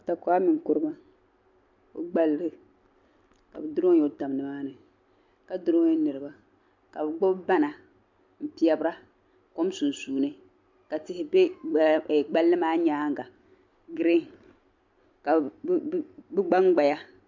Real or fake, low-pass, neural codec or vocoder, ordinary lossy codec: real; 7.2 kHz; none; MP3, 64 kbps